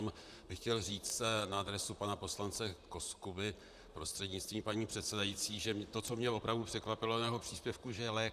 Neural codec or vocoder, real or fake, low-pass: vocoder, 44.1 kHz, 128 mel bands, Pupu-Vocoder; fake; 14.4 kHz